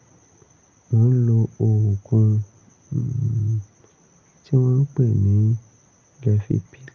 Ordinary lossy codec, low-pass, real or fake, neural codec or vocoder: Opus, 24 kbps; 7.2 kHz; real; none